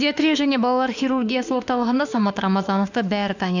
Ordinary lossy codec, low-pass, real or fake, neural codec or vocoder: none; 7.2 kHz; fake; autoencoder, 48 kHz, 32 numbers a frame, DAC-VAE, trained on Japanese speech